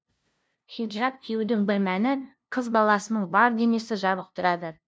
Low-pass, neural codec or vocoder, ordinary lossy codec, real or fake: none; codec, 16 kHz, 0.5 kbps, FunCodec, trained on LibriTTS, 25 frames a second; none; fake